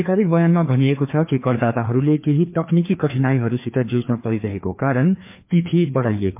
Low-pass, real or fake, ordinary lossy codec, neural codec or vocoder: 3.6 kHz; fake; MP3, 32 kbps; codec, 16 kHz, 2 kbps, FreqCodec, larger model